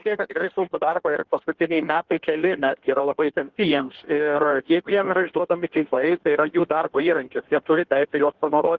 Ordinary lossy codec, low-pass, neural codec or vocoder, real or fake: Opus, 16 kbps; 7.2 kHz; codec, 16 kHz in and 24 kHz out, 1.1 kbps, FireRedTTS-2 codec; fake